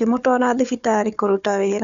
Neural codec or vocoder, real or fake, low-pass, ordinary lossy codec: codec, 16 kHz, 4 kbps, FunCodec, trained on Chinese and English, 50 frames a second; fake; 7.2 kHz; none